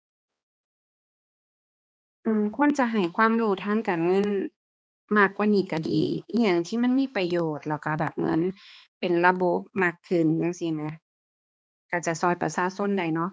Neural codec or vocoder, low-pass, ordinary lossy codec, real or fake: codec, 16 kHz, 2 kbps, X-Codec, HuBERT features, trained on balanced general audio; none; none; fake